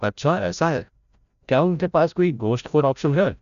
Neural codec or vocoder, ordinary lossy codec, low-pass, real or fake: codec, 16 kHz, 0.5 kbps, FreqCodec, larger model; none; 7.2 kHz; fake